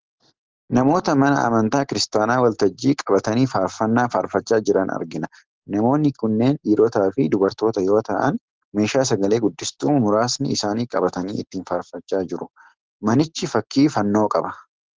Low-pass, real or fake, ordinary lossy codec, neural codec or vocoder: 7.2 kHz; real; Opus, 16 kbps; none